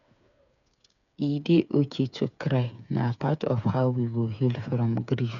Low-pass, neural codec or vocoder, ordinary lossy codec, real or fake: 7.2 kHz; codec, 16 kHz, 8 kbps, FreqCodec, smaller model; none; fake